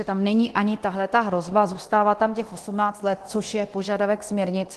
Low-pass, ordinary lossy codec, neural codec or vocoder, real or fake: 10.8 kHz; Opus, 16 kbps; codec, 24 kHz, 0.9 kbps, DualCodec; fake